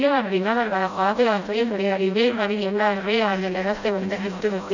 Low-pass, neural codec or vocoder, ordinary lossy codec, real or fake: 7.2 kHz; codec, 16 kHz, 0.5 kbps, FreqCodec, smaller model; none; fake